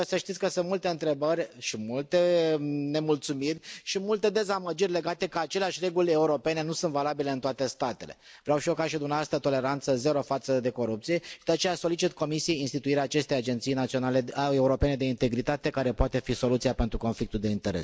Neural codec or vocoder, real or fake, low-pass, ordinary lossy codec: none; real; none; none